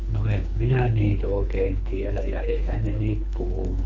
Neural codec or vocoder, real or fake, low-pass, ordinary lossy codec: codec, 24 kHz, 3 kbps, HILCodec; fake; 7.2 kHz; none